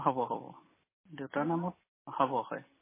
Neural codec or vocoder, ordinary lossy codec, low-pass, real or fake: none; MP3, 16 kbps; 3.6 kHz; real